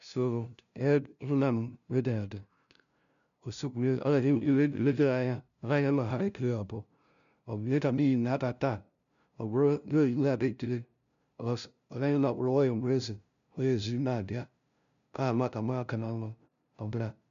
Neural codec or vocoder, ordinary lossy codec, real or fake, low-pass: codec, 16 kHz, 0.5 kbps, FunCodec, trained on LibriTTS, 25 frames a second; none; fake; 7.2 kHz